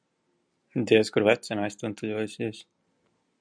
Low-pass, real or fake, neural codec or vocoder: 9.9 kHz; real; none